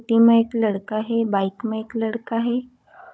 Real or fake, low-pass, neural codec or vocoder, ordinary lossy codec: fake; none; codec, 16 kHz, 16 kbps, FunCodec, trained on Chinese and English, 50 frames a second; none